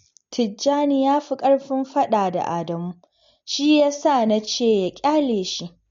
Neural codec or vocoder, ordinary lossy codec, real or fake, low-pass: none; MP3, 48 kbps; real; 7.2 kHz